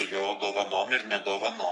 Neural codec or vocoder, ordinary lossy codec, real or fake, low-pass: codec, 44.1 kHz, 3.4 kbps, Pupu-Codec; MP3, 64 kbps; fake; 10.8 kHz